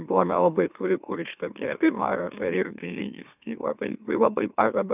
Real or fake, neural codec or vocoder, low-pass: fake; autoencoder, 44.1 kHz, a latent of 192 numbers a frame, MeloTTS; 3.6 kHz